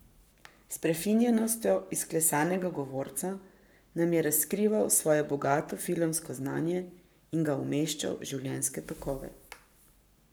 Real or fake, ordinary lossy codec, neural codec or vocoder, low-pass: fake; none; codec, 44.1 kHz, 7.8 kbps, Pupu-Codec; none